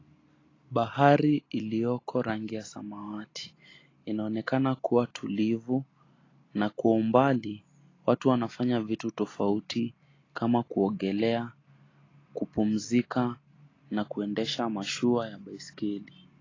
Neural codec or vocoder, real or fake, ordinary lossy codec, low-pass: none; real; AAC, 32 kbps; 7.2 kHz